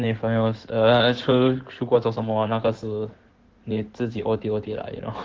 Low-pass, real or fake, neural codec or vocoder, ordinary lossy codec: 7.2 kHz; fake; codec, 16 kHz in and 24 kHz out, 2.2 kbps, FireRedTTS-2 codec; Opus, 16 kbps